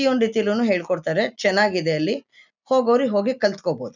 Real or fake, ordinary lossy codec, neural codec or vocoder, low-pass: real; none; none; 7.2 kHz